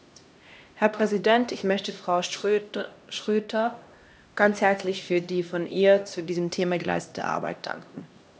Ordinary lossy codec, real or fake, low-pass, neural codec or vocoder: none; fake; none; codec, 16 kHz, 1 kbps, X-Codec, HuBERT features, trained on LibriSpeech